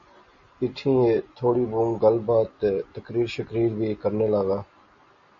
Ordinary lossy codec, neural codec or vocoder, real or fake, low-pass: MP3, 32 kbps; none; real; 7.2 kHz